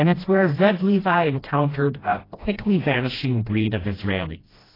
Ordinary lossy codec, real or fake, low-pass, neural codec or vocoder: AAC, 24 kbps; fake; 5.4 kHz; codec, 16 kHz, 1 kbps, FreqCodec, smaller model